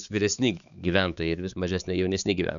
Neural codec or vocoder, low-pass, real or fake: codec, 16 kHz, 4 kbps, X-Codec, HuBERT features, trained on balanced general audio; 7.2 kHz; fake